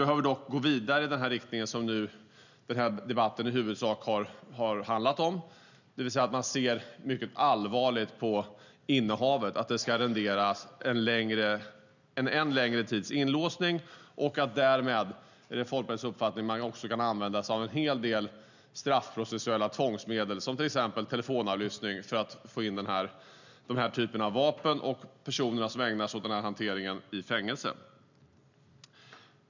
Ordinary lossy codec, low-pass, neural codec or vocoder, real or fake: none; 7.2 kHz; none; real